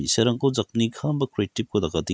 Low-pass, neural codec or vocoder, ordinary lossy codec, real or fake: none; none; none; real